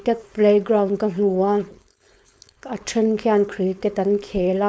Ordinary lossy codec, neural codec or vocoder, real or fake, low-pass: none; codec, 16 kHz, 4.8 kbps, FACodec; fake; none